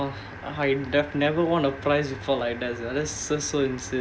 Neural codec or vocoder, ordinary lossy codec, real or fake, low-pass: none; none; real; none